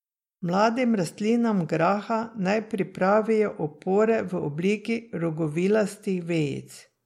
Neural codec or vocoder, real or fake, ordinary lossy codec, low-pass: none; real; MP3, 64 kbps; 19.8 kHz